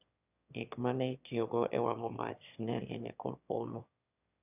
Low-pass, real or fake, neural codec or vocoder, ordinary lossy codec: 3.6 kHz; fake; autoencoder, 22.05 kHz, a latent of 192 numbers a frame, VITS, trained on one speaker; none